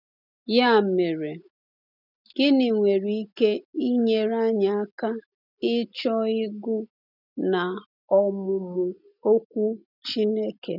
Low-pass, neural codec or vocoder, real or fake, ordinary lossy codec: 5.4 kHz; none; real; none